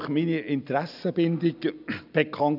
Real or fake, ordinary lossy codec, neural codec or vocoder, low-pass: real; none; none; 5.4 kHz